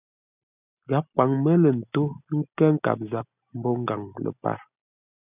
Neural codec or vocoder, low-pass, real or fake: none; 3.6 kHz; real